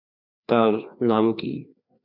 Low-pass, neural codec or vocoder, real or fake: 5.4 kHz; codec, 16 kHz, 2 kbps, FreqCodec, larger model; fake